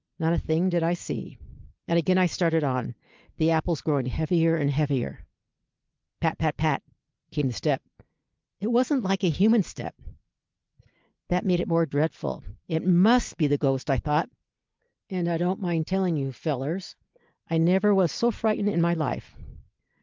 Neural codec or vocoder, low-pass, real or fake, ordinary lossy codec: none; 7.2 kHz; real; Opus, 32 kbps